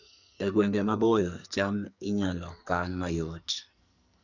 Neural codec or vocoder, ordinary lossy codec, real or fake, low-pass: codec, 44.1 kHz, 2.6 kbps, SNAC; none; fake; 7.2 kHz